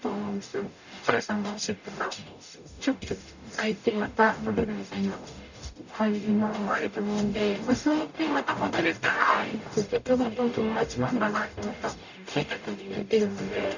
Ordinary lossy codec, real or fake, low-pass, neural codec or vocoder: none; fake; 7.2 kHz; codec, 44.1 kHz, 0.9 kbps, DAC